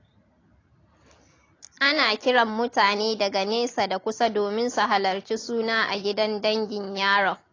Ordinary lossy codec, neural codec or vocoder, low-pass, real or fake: AAC, 32 kbps; none; 7.2 kHz; real